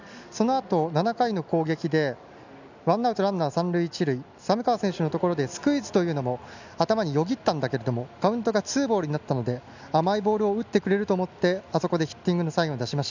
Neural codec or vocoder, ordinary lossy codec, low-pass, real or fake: none; none; 7.2 kHz; real